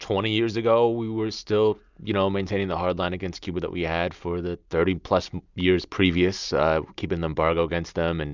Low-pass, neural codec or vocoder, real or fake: 7.2 kHz; none; real